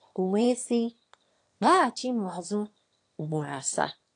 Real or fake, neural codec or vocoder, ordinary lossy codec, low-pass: fake; autoencoder, 22.05 kHz, a latent of 192 numbers a frame, VITS, trained on one speaker; AAC, 48 kbps; 9.9 kHz